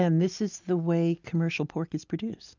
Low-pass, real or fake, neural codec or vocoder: 7.2 kHz; real; none